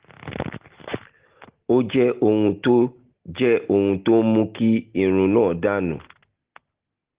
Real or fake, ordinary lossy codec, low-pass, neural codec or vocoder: real; Opus, 16 kbps; 3.6 kHz; none